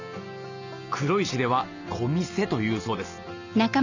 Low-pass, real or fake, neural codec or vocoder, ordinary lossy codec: 7.2 kHz; real; none; none